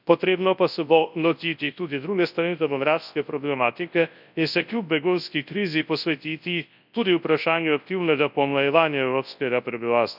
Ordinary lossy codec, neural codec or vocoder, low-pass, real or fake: none; codec, 24 kHz, 0.9 kbps, WavTokenizer, large speech release; 5.4 kHz; fake